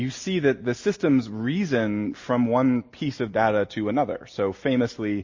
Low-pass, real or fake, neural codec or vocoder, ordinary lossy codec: 7.2 kHz; real; none; MP3, 32 kbps